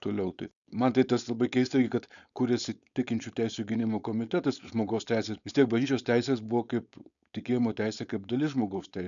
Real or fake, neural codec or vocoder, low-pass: fake; codec, 16 kHz, 4.8 kbps, FACodec; 7.2 kHz